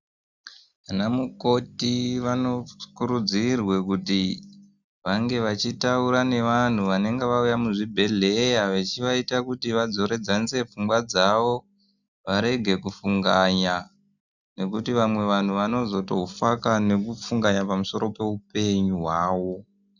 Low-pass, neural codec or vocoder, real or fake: 7.2 kHz; none; real